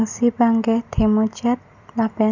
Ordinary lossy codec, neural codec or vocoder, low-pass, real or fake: none; none; 7.2 kHz; real